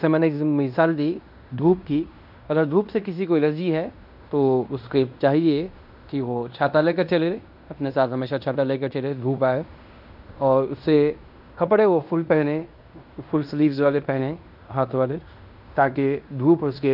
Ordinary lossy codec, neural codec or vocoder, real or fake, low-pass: none; codec, 16 kHz in and 24 kHz out, 0.9 kbps, LongCat-Audio-Codec, fine tuned four codebook decoder; fake; 5.4 kHz